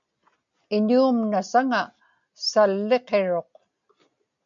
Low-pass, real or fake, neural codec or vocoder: 7.2 kHz; real; none